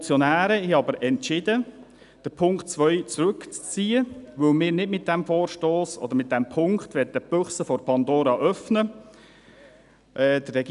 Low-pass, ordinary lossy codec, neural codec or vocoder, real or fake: 10.8 kHz; none; none; real